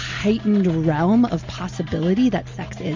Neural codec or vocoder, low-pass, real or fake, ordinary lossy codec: none; 7.2 kHz; real; MP3, 64 kbps